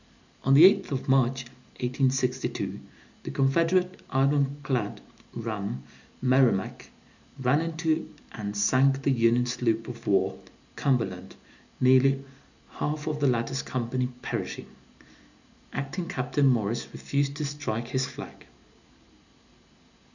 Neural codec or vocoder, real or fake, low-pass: none; real; 7.2 kHz